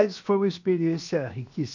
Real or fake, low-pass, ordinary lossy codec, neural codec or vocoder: fake; 7.2 kHz; none; codec, 16 kHz, 0.7 kbps, FocalCodec